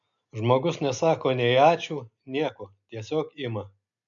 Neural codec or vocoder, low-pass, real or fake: none; 7.2 kHz; real